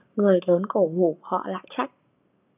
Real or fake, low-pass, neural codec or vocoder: fake; 3.6 kHz; codec, 44.1 kHz, 7.8 kbps, Pupu-Codec